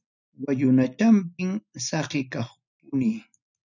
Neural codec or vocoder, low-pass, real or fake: none; 7.2 kHz; real